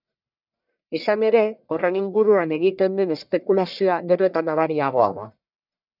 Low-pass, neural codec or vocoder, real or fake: 5.4 kHz; codec, 44.1 kHz, 1.7 kbps, Pupu-Codec; fake